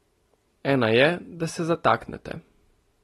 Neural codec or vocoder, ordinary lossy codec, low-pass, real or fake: none; AAC, 32 kbps; 19.8 kHz; real